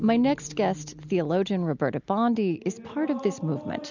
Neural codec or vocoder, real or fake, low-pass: none; real; 7.2 kHz